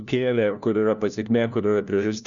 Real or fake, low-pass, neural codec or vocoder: fake; 7.2 kHz; codec, 16 kHz, 1 kbps, FunCodec, trained on LibriTTS, 50 frames a second